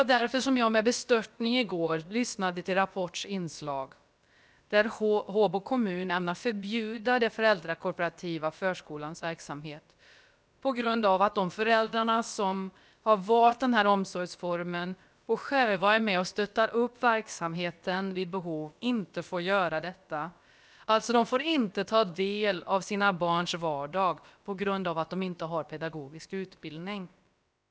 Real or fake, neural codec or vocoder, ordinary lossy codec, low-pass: fake; codec, 16 kHz, about 1 kbps, DyCAST, with the encoder's durations; none; none